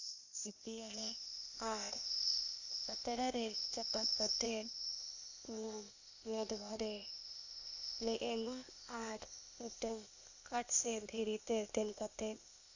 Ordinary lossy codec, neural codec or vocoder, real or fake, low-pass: none; codec, 16 kHz, 0.8 kbps, ZipCodec; fake; 7.2 kHz